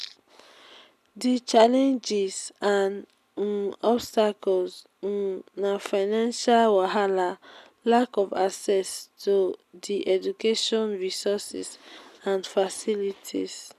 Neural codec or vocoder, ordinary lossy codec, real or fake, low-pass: none; none; real; 14.4 kHz